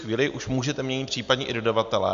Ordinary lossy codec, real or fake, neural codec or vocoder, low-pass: MP3, 64 kbps; real; none; 7.2 kHz